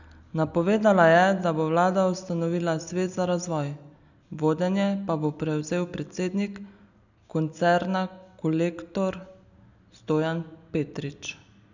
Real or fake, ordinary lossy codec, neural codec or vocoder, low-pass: real; none; none; 7.2 kHz